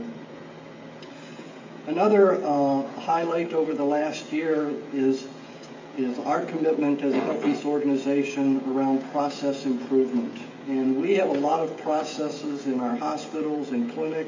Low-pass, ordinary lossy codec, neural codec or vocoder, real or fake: 7.2 kHz; MP3, 32 kbps; codec, 16 kHz, 16 kbps, FreqCodec, smaller model; fake